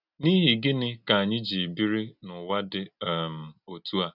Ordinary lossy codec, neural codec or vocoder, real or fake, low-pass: none; none; real; 5.4 kHz